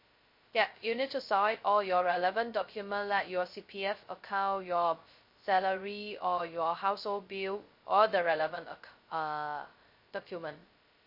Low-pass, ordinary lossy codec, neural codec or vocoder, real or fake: 5.4 kHz; MP3, 32 kbps; codec, 16 kHz, 0.2 kbps, FocalCodec; fake